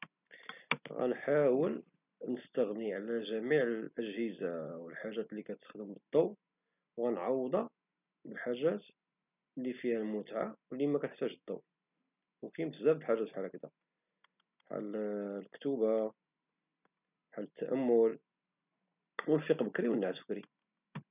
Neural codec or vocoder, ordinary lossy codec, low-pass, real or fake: none; none; 3.6 kHz; real